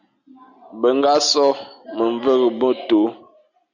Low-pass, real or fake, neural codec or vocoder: 7.2 kHz; real; none